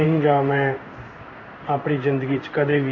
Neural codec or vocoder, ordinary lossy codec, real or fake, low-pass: codec, 16 kHz in and 24 kHz out, 1 kbps, XY-Tokenizer; none; fake; 7.2 kHz